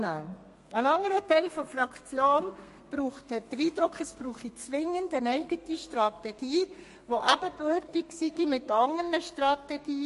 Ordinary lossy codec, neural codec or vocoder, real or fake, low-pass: MP3, 48 kbps; codec, 32 kHz, 1.9 kbps, SNAC; fake; 14.4 kHz